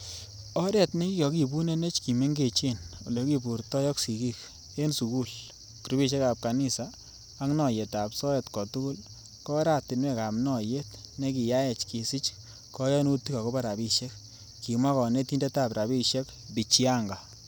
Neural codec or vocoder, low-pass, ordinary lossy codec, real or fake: none; none; none; real